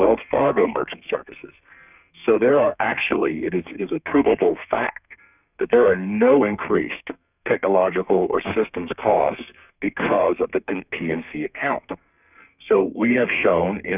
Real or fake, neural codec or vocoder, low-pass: fake; codec, 32 kHz, 1.9 kbps, SNAC; 3.6 kHz